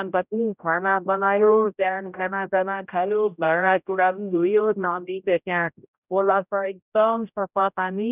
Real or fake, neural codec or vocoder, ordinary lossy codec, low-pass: fake; codec, 16 kHz, 0.5 kbps, X-Codec, HuBERT features, trained on general audio; none; 3.6 kHz